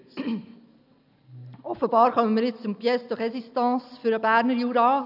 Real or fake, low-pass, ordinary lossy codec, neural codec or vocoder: real; 5.4 kHz; none; none